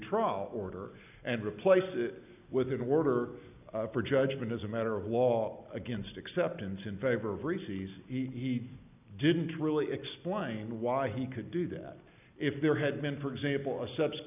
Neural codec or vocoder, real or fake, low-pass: none; real; 3.6 kHz